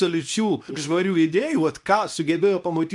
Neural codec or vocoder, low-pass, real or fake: codec, 24 kHz, 0.9 kbps, WavTokenizer, medium speech release version 2; 10.8 kHz; fake